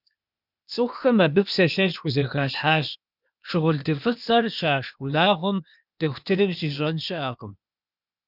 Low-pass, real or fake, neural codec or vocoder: 5.4 kHz; fake; codec, 16 kHz, 0.8 kbps, ZipCodec